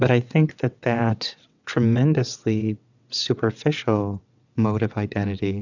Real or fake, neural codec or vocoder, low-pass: fake; vocoder, 22.05 kHz, 80 mel bands, WaveNeXt; 7.2 kHz